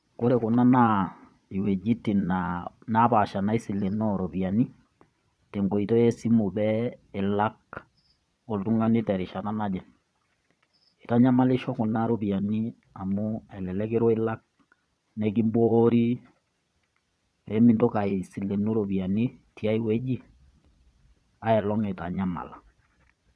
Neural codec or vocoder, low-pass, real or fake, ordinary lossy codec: vocoder, 22.05 kHz, 80 mel bands, Vocos; none; fake; none